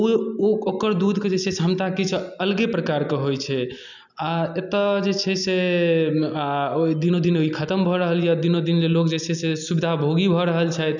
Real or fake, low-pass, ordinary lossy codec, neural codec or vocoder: real; 7.2 kHz; none; none